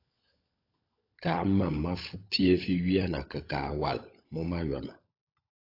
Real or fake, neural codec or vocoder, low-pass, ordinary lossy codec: fake; codec, 16 kHz, 16 kbps, FunCodec, trained on LibriTTS, 50 frames a second; 5.4 kHz; AAC, 32 kbps